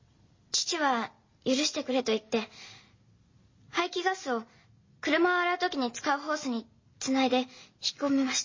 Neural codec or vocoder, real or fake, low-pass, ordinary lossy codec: none; real; 7.2 kHz; MP3, 32 kbps